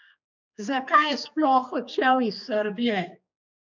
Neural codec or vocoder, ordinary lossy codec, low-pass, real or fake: codec, 16 kHz, 2 kbps, X-Codec, HuBERT features, trained on general audio; none; 7.2 kHz; fake